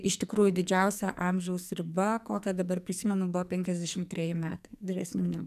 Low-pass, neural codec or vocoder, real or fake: 14.4 kHz; codec, 44.1 kHz, 2.6 kbps, SNAC; fake